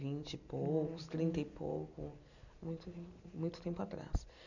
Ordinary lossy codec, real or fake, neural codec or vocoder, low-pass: none; real; none; 7.2 kHz